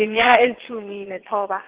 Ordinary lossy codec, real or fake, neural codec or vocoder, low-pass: Opus, 16 kbps; fake; vocoder, 22.05 kHz, 80 mel bands, WaveNeXt; 3.6 kHz